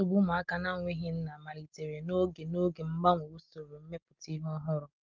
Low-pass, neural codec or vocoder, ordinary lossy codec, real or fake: 7.2 kHz; none; Opus, 32 kbps; real